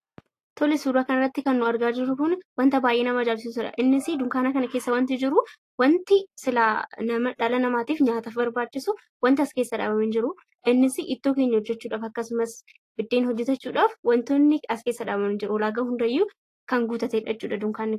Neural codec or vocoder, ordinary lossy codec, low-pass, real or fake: none; AAC, 48 kbps; 14.4 kHz; real